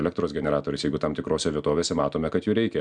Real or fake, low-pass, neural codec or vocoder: real; 9.9 kHz; none